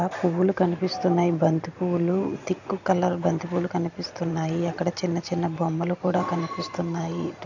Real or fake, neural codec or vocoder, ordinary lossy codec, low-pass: fake; vocoder, 44.1 kHz, 128 mel bands every 512 samples, BigVGAN v2; none; 7.2 kHz